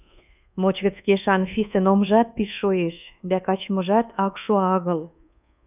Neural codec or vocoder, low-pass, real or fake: codec, 24 kHz, 1.2 kbps, DualCodec; 3.6 kHz; fake